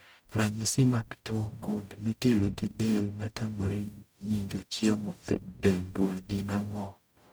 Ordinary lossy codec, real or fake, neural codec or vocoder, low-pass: none; fake; codec, 44.1 kHz, 0.9 kbps, DAC; none